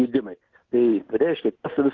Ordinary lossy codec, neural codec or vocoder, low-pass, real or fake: Opus, 16 kbps; none; 7.2 kHz; real